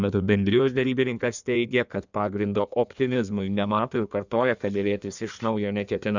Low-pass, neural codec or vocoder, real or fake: 7.2 kHz; codec, 16 kHz in and 24 kHz out, 1.1 kbps, FireRedTTS-2 codec; fake